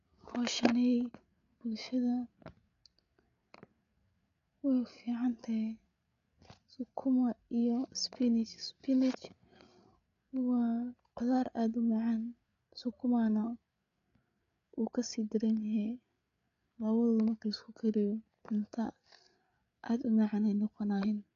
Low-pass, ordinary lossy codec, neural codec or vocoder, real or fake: 7.2 kHz; none; codec, 16 kHz, 8 kbps, FreqCodec, larger model; fake